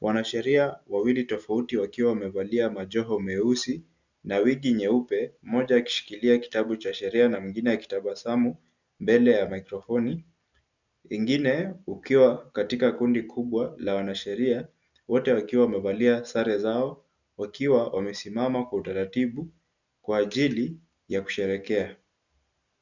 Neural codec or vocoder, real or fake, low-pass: none; real; 7.2 kHz